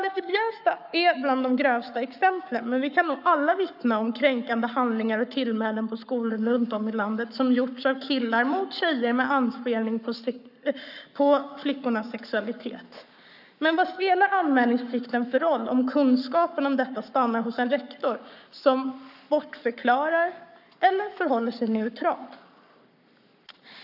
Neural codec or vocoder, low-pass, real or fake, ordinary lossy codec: codec, 44.1 kHz, 7.8 kbps, Pupu-Codec; 5.4 kHz; fake; none